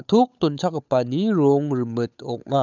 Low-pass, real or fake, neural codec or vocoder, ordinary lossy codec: 7.2 kHz; fake; codec, 16 kHz, 8 kbps, FunCodec, trained on Chinese and English, 25 frames a second; none